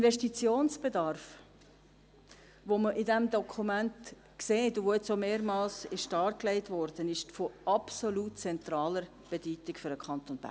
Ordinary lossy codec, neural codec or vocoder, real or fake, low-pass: none; none; real; none